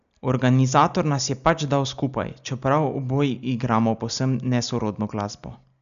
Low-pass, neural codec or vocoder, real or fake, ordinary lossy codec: 7.2 kHz; none; real; none